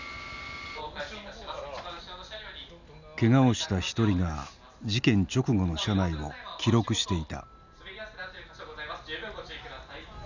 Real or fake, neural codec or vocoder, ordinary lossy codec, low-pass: real; none; none; 7.2 kHz